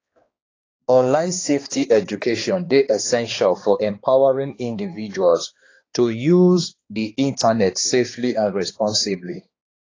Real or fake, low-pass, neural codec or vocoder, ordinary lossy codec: fake; 7.2 kHz; codec, 16 kHz, 2 kbps, X-Codec, HuBERT features, trained on balanced general audio; AAC, 32 kbps